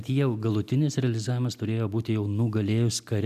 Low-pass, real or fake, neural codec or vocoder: 14.4 kHz; real; none